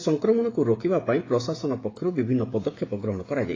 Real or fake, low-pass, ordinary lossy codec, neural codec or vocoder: fake; 7.2 kHz; AAC, 32 kbps; codec, 16 kHz, 16 kbps, FreqCodec, smaller model